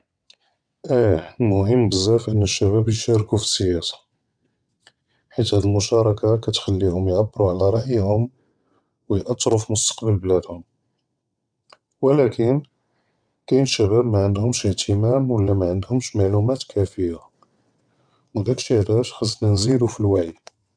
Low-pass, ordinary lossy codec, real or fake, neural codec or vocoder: 9.9 kHz; none; fake; vocoder, 22.05 kHz, 80 mel bands, WaveNeXt